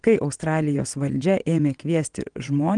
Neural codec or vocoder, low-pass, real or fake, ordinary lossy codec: vocoder, 22.05 kHz, 80 mel bands, WaveNeXt; 9.9 kHz; fake; Opus, 24 kbps